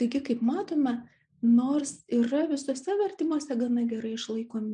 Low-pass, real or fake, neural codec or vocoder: 9.9 kHz; real; none